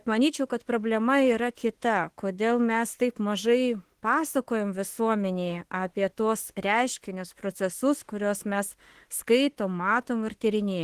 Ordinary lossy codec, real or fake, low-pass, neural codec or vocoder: Opus, 16 kbps; fake; 14.4 kHz; autoencoder, 48 kHz, 32 numbers a frame, DAC-VAE, trained on Japanese speech